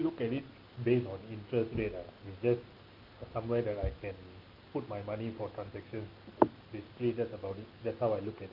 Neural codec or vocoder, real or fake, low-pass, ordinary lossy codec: none; real; 5.4 kHz; Opus, 24 kbps